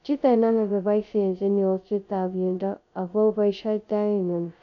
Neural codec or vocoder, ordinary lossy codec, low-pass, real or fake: codec, 16 kHz, 0.2 kbps, FocalCodec; none; 7.2 kHz; fake